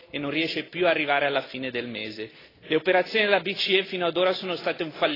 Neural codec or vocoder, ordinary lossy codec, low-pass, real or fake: none; AAC, 24 kbps; 5.4 kHz; real